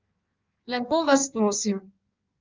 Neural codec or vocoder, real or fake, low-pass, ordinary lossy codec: codec, 16 kHz in and 24 kHz out, 1.1 kbps, FireRedTTS-2 codec; fake; 7.2 kHz; Opus, 24 kbps